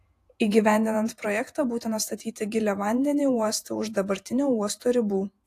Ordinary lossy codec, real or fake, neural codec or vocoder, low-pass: AAC, 64 kbps; fake; vocoder, 48 kHz, 128 mel bands, Vocos; 14.4 kHz